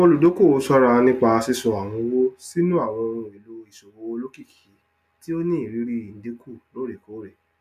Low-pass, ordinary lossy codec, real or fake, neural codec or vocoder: 14.4 kHz; none; real; none